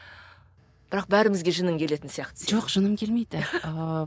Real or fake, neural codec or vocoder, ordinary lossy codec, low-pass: real; none; none; none